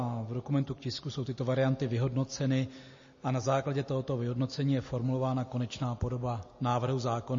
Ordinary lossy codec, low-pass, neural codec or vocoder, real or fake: MP3, 32 kbps; 7.2 kHz; none; real